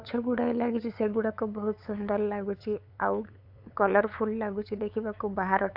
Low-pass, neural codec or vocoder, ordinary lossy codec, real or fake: 5.4 kHz; codec, 16 kHz, 8 kbps, FunCodec, trained on LibriTTS, 25 frames a second; AAC, 48 kbps; fake